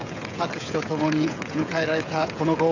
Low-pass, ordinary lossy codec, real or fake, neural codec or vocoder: 7.2 kHz; none; fake; codec, 16 kHz, 16 kbps, FreqCodec, smaller model